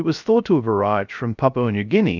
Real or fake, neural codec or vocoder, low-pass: fake; codec, 16 kHz, 0.3 kbps, FocalCodec; 7.2 kHz